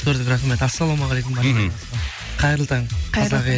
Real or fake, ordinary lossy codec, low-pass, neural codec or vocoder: real; none; none; none